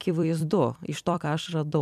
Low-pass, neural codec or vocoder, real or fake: 14.4 kHz; vocoder, 44.1 kHz, 128 mel bands every 256 samples, BigVGAN v2; fake